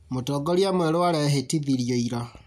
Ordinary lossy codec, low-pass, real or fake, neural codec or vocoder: none; 14.4 kHz; real; none